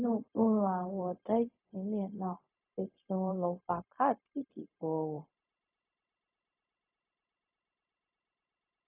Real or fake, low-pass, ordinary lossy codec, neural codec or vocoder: fake; 3.6 kHz; AAC, 32 kbps; codec, 16 kHz, 0.4 kbps, LongCat-Audio-Codec